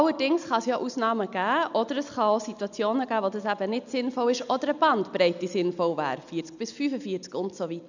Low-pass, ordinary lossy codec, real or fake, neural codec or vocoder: 7.2 kHz; none; real; none